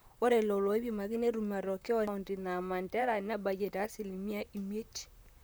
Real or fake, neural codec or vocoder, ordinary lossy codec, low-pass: fake; vocoder, 44.1 kHz, 128 mel bands, Pupu-Vocoder; none; none